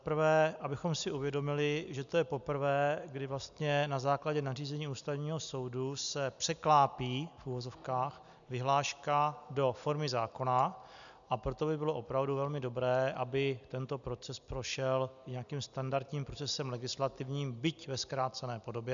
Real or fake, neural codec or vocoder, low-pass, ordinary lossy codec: real; none; 7.2 kHz; MP3, 96 kbps